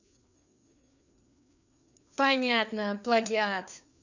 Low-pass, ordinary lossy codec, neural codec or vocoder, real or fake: 7.2 kHz; none; codec, 16 kHz, 2 kbps, FreqCodec, larger model; fake